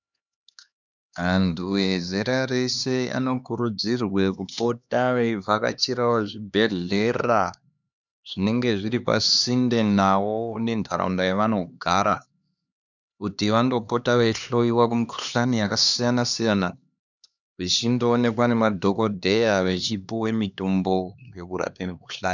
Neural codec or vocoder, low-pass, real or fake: codec, 16 kHz, 2 kbps, X-Codec, HuBERT features, trained on LibriSpeech; 7.2 kHz; fake